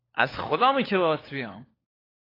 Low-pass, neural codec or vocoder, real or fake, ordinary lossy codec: 5.4 kHz; codec, 16 kHz, 4 kbps, FunCodec, trained on LibriTTS, 50 frames a second; fake; AAC, 24 kbps